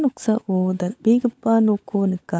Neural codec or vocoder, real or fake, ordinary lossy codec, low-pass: codec, 16 kHz, 16 kbps, FunCodec, trained on LibriTTS, 50 frames a second; fake; none; none